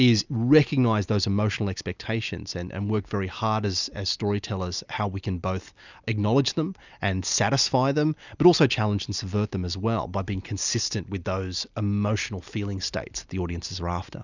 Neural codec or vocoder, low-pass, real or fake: none; 7.2 kHz; real